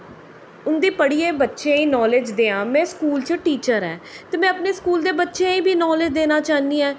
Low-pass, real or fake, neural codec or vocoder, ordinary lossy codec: none; real; none; none